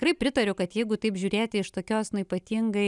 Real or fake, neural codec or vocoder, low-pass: real; none; 10.8 kHz